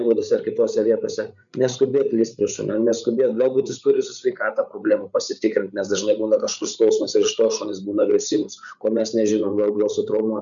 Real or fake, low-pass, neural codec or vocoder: fake; 7.2 kHz; codec, 16 kHz, 8 kbps, FreqCodec, larger model